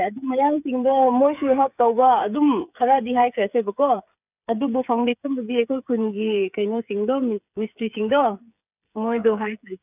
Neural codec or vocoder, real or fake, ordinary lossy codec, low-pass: codec, 16 kHz, 8 kbps, FreqCodec, smaller model; fake; none; 3.6 kHz